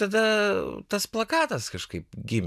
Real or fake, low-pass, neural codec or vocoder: real; 14.4 kHz; none